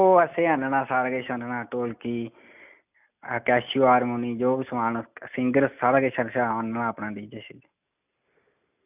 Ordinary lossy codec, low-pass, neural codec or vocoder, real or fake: none; 3.6 kHz; none; real